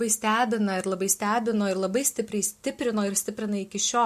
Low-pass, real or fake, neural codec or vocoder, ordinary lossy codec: 14.4 kHz; real; none; MP3, 64 kbps